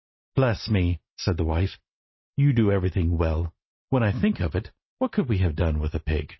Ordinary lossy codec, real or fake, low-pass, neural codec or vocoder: MP3, 24 kbps; real; 7.2 kHz; none